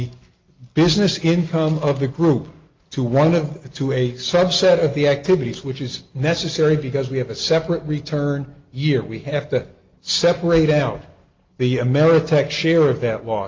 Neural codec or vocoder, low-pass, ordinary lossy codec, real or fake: none; 7.2 kHz; Opus, 24 kbps; real